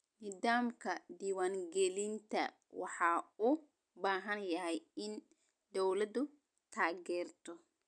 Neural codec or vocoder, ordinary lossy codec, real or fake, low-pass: none; none; real; 9.9 kHz